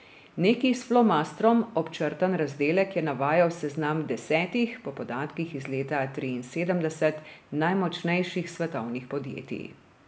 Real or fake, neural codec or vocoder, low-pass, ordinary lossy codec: real; none; none; none